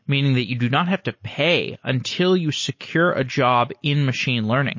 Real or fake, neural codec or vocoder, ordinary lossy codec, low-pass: real; none; MP3, 32 kbps; 7.2 kHz